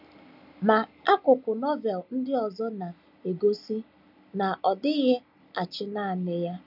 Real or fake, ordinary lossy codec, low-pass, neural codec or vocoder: real; none; 5.4 kHz; none